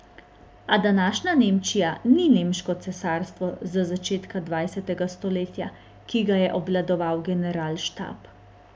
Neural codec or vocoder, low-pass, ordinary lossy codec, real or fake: none; none; none; real